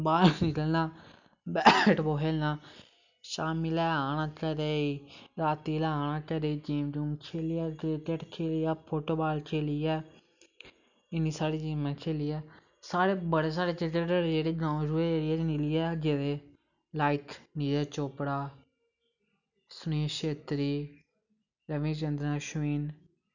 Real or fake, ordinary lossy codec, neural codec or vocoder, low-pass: real; none; none; 7.2 kHz